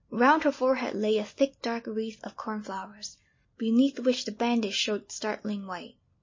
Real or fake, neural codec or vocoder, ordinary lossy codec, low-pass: real; none; MP3, 32 kbps; 7.2 kHz